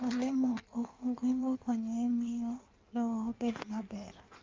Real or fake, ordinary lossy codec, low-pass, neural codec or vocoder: fake; Opus, 32 kbps; 7.2 kHz; codec, 16 kHz in and 24 kHz out, 1.1 kbps, FireRedTTS-2 codec